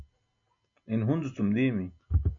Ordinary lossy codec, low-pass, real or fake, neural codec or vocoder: MP3, 48 kbps; 7.2 kHz; real; none